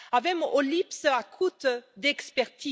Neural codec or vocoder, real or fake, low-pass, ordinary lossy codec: none; real; none; none